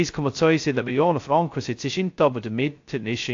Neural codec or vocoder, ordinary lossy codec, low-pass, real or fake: codec, 16 kHz, 0.2 kbps, FocalCodec; AAC, 48 kbps; 7.2 kHz; fake